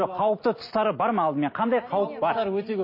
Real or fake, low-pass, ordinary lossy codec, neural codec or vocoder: real; 5.4 kHz; MP3, 24 kbps; none